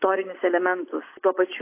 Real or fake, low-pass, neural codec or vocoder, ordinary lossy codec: real; 3.6 kHz; none; AAC, 24 kbps